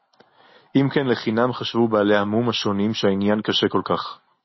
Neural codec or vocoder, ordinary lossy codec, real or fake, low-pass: none; MP3, 24 kbps; real; 7.2 kHz